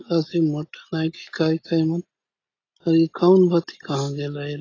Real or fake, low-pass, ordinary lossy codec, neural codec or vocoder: real; 7.2 kHz; AAC, 32 kbps; none